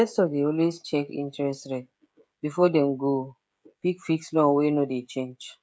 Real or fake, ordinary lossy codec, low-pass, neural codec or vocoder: fake; none; none; codec, 16 kHz, 16 kbps, FreqCodec, smaller model